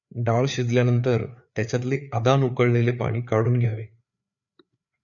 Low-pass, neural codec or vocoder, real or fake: 7.2 kHz; codec, 16 kHz, 4 kbps, FreqCodec, larger model; fake